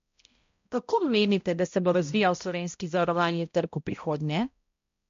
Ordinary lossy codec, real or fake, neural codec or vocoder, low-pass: MP3, 48 kbps; fake; codec, 16 kHz, 0.5 kbps, X-Codec, HuBERT features, trained on balanced general audio; 7.2 kHz